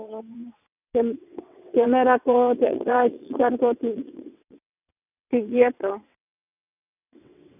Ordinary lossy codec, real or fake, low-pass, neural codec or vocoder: none; fake; 3.6 kHz; vocoder, 22.05 kHz, 80 mel bands, WaveNeXt